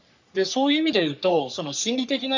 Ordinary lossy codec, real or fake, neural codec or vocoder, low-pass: MP3, 64 kbps; fake; codec, 44.1 kHz, 3.4 kbps, Pupu-Codec; 7.2 kHz